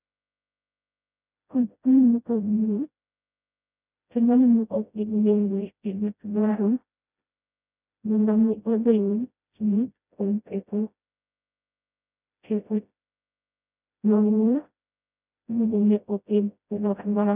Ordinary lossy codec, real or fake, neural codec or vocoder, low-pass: none; fake; codec, 16 kHz, 0.5 kbps, FreqCodec, smaller model; 3.6 kHz